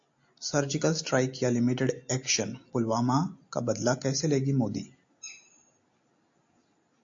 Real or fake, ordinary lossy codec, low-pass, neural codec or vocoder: real; AAC, 48 kbps; 7.2 kHz; none